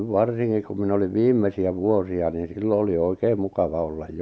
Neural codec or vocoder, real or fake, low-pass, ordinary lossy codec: none; real; none; none